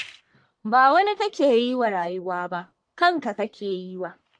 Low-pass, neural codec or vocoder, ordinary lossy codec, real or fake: 9.9 kHz; codec, 44.1 kHz, 1.7 kbps, Pupu-Codec; MP3, 64 kbps; fake